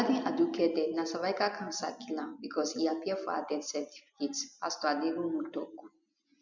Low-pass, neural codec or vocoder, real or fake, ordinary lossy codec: 7.2 kHz; none; real; none